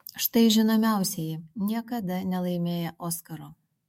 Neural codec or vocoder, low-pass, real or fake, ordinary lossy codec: autoencoder, 48 kHz, 128 numbers a frame, DAC-VAE, trained on Japanese speech; 19.8 kHz; fake; MP3, 64 kbps